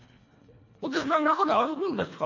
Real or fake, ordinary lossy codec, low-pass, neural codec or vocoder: fake; AAC, 48 kbps; 7.2 kHz; codec, 24 kHz, 1.5 kbps, HILCodec